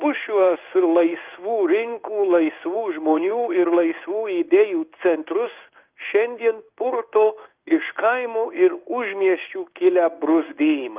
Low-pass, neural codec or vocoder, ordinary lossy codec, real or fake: 3.6 kHz; codec, 16 kHz in and 24 kHz out, 1 kbps, XY-Tokenizer; Opus, 24 kbps; fake